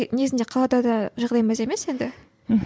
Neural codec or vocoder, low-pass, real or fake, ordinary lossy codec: none; none; real; none